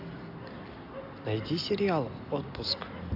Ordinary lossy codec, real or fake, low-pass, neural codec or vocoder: AAC, 32 kbps; real; 5.4 kHz; none